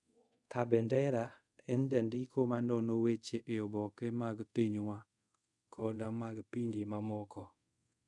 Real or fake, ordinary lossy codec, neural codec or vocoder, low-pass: fake; none; codec, 24 kHz, 0.5 kbps, DualCodec; 10.8 kHz